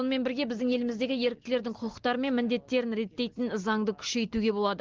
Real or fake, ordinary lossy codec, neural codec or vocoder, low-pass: real; Opus, 16 kbps; none; 7.2 kHz